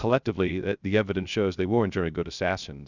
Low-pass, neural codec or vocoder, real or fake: 7.2 kHz; codec, 16 kHz, 0.3 kbps, FocalCodec; fake